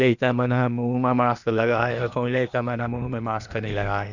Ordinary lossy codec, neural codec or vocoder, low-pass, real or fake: none; codec, 16 kHz, 0.8 kbps, ZipCodec; 7.2 kHz; fake